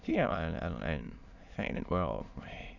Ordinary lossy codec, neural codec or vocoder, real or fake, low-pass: none; autoencoder, 22.05 kHz, a latent of 192 numbers a frame, VITS, trained on many speakers; fake; 7.2 kHz